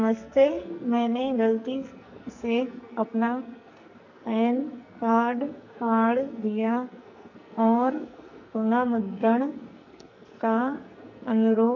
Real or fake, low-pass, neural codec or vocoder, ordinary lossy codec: fake; 7.2 kHz; codec, 44.1 kHz, 2.6 kbps, SNAC; none